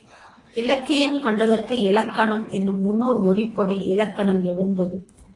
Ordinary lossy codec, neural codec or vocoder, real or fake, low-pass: AAC, 32 kbps; codec, 24 kHz, 1.5 kbps, HILCodec; fake; 10.8 kHz